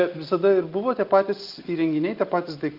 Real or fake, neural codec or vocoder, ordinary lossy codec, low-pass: real; none; Opus, 24 kbps; 5.4 kHz